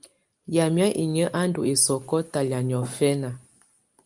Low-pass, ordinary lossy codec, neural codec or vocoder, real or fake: 10.8 kHz; Opus, 32 kbps; none; real